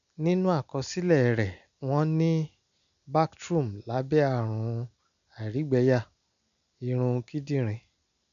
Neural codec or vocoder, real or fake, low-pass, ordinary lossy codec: none; real; 7.2 kHz; none